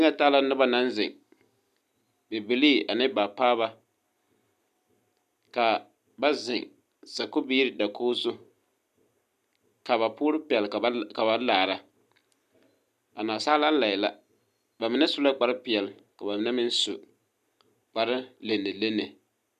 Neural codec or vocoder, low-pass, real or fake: none; 14.4 kHz; real